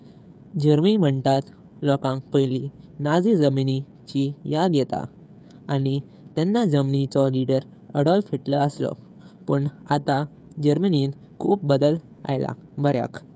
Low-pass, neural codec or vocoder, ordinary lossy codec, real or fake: none; codec, 16 kHz, 16 kbps, FreqCodec, smaller model; none; fake